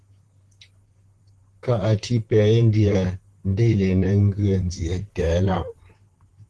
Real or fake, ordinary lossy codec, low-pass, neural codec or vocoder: fake; Opus, 16 kbps; 10.8 kHz; vocoder, 44.1 kHz, 128 mel bands, Pupu-Vocoder